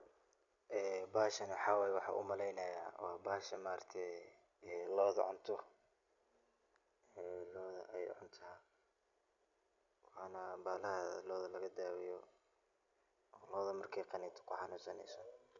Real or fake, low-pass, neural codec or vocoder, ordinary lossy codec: real; 7.2 kHz; none; none